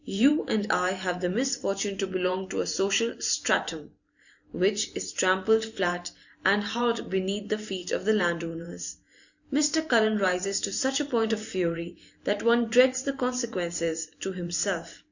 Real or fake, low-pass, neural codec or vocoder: fake; 7.2 kHz; vocoder, 44.1 kHz, 128 mel bands every 512 samples, BigVGAN v2